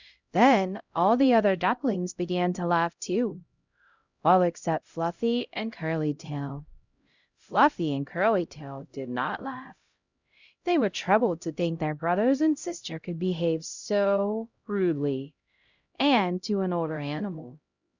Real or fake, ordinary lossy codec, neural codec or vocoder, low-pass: fake; Opus, 64 kbps; codec, 16 kHz, 0.5 kbps, X-Codec, HuBERT features, trained on LibriSpeech; 7.2 kHz